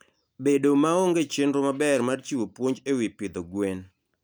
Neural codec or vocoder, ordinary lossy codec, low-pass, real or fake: none; none; none; real